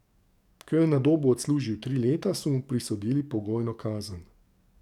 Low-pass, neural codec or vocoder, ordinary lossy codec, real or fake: 19.8 kHz; codec, 44.1 kHz, 7.8 kbps, DAC; none; fake